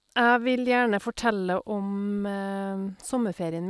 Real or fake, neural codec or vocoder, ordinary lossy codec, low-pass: real; none; none; none